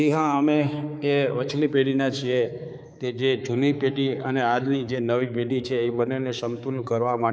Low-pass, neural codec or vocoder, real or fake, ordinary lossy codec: none; codec, 16 kHz, 4 kbps, X-Codec, HuBERT features, trained on balanced general audio; fake; none